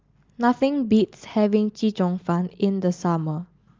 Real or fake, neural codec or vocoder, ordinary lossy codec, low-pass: real; none; Opus, 32 kbps; 7.2 kHz